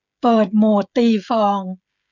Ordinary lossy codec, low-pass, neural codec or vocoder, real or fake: none; 7.2 kHz; codec, 16 kHz, 16 kbps, FreqCodec, smaller model; fake